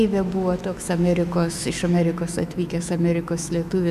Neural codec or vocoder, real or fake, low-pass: autoencoder, 48 kHz, 128 numbers a frame, DAC-VAE, trained on Japanese speech; fake; 14.4 kHz